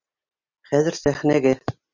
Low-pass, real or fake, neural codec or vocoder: 7.2 kHz; real; none